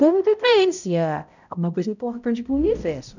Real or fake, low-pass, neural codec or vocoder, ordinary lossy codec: fake; 7.2 kHz; codec, 16 kHz, 0.5 kbps, X-Codec, HuBERT features, trained on balanced general audio; none